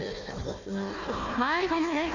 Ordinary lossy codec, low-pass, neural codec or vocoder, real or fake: Opus, 64 kbps; 7.2 kHz; codec, 16 kHz, 1 kbps, FunCodec, trained on Chinese and English, 50 frames a second; fake